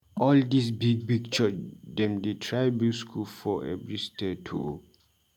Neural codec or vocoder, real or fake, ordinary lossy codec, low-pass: none; real; MP3, 96 kbps; 19.8 kHz